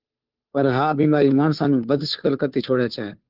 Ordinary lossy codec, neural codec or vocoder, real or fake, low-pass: Opus, 24 kbps; codec, 16 kHz, 2 kbps, FunCodec, trained on Chinese and English, 25 frames a second; fake; 5.4 kHz